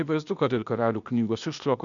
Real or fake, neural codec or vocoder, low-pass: fake; codec, 16 kHz, 0.8 kbps, ZipCodec; 7.2 kHz